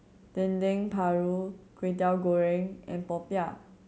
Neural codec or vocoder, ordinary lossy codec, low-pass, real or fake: none; none; none; real